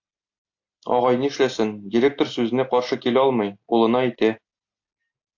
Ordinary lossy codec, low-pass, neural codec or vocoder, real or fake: AAC, 48 kbps; 7.2 kHz; none; real